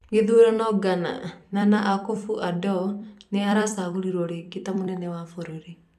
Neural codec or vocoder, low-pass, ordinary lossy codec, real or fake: vocoder, 44.1 kHz, 128 mel bands every 256 samples, BigVGAN v2; 14.4 kHz; none; fake